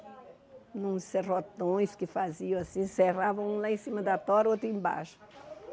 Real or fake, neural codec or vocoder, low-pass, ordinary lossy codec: real; none; none; none